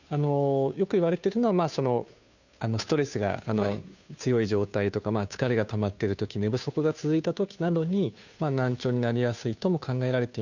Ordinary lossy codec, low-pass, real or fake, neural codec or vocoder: none; 7.2 kHz; fake; codec, 16 kHz, 2 kbps, FunCodec, trained on Chinese and English, 25 frames a second